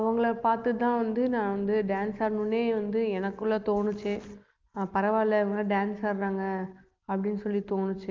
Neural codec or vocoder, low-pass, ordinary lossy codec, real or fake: none; 7.2 kHz; Opus, 32 kbps; real